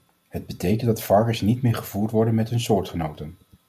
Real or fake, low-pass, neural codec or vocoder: real; 14.4 kHz; none